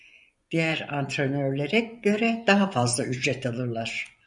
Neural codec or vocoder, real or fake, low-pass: vocoder, 24 kHz, 100 mel bands, Vocos; fake; 10.8 kHz